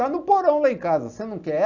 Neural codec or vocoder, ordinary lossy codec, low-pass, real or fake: none; none; 7.2 kHz; real